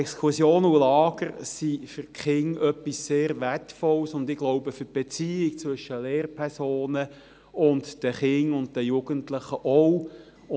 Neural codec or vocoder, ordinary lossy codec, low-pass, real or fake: none; none; none; real